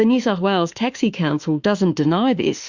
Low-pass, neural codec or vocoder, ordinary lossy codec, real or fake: 7.2 kHz; autoencoder, 48 kHz, 32 numbers a frame, DAC-VAE, trained on Japanese speech; Opus, 64 kbps; fake